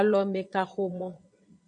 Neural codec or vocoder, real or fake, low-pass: vocoder, 22.05 kHz, 80 mel bands, Vocos; fake; 9.9 kHz